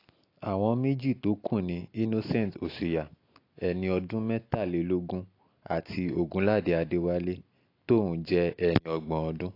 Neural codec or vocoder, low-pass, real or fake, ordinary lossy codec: none; 5.4 kHz; real; AAC, 32 kbps